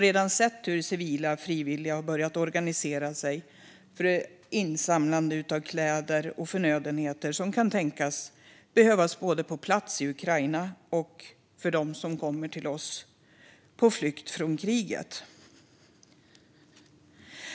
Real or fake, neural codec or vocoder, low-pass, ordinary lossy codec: real; none; none; none